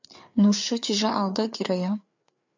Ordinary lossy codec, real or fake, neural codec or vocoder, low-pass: MP3, 64 kbps; fake; codec, 16 kHz, 4 kbps, FreqCodec, larger model; 7.2 kHz